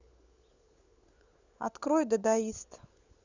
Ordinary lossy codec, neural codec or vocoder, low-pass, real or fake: Opus, 64 kbps; codec, 16 kHz, 16 kbps, FunCodec, trained on LibriTTS, 50 frames a second; 7.2 kHz; fake